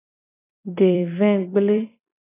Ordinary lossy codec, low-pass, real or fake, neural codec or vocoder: AAC, 16 kbps; 3.6 kHz; fake; vocoder, 44.1 kHz, 128 mel bands every 256 samples, BigVGAN v2